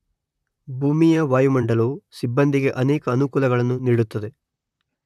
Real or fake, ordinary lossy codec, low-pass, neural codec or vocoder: fake; none; 14.4 kHz; vocoder, 44.1 kHz, 128 mel bands, Pupu-Vocoder